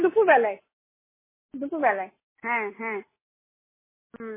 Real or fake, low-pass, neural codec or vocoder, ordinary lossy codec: real; 3.6 kHz; none; MP3, 16 kbps